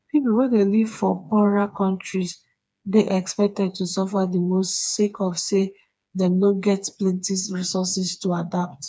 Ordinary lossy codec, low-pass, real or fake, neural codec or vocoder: none; none; fake; codec, 16 kHz, 4 kbps, FreqCodec, smaller model